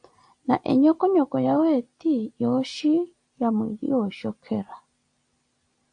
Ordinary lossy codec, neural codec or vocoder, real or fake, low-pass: MP3, 48 kbps; none; real; 9.9 kHz